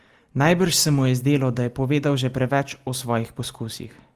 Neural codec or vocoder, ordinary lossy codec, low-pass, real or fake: none; Opus, 24 kbps; 14.4 kHz; real